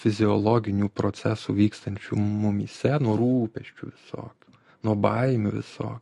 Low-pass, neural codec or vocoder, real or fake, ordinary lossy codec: 14.4 kHz; none; real; MP3, 48 kbps